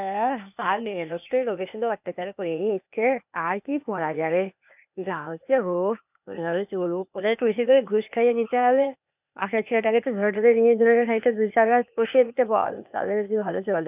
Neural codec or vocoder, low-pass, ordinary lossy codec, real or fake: codec, 16 kHz, 0.8 kbps, ZipCodec; 3.6 kHz; none; fake